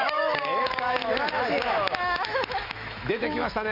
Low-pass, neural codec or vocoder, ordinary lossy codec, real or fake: 5.4 kHz; autoencoder, 48 kHz, 128 numbers a frame, DAC-VAE, trained on Japanese speech; none; fake